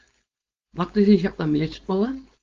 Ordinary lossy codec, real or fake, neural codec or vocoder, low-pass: Opus, 32 kbps; fake; codec, 16 kHz, 4.8 kbps, FACodec; 7.2 kHz